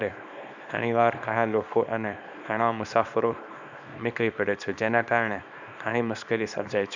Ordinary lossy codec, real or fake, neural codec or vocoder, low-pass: none; fake; codec, 24 kHz, 0.9 kbps, WavTokenizer, small release; 7.2 kHz